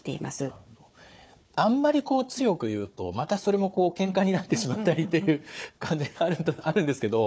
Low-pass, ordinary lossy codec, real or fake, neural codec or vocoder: none; none; fake; codec, 16 kHz, 4 kbps, FunCodec, trained on Chinese and English, 50 frames a second